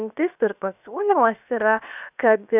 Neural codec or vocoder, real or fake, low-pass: codec, 16 kHz, 0.7 kbps, FocalCodec; fake; 3.6 kHz